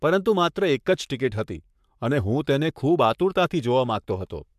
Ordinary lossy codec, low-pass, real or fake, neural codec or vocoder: MP3, 96 kbps; 14.4 kHz; fake; codec, 44.1 kHz, 7.8 kbps, Pupu-Codec